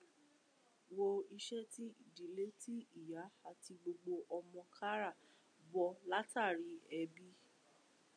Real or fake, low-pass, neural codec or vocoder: real; 9.9 kHz; none